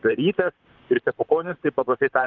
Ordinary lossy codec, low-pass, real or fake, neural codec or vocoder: Opus, 24 kbps; 7.2 kHz; fake; vocoder, 44.1 kHz, 128 mel bands, Pupu-Vocoder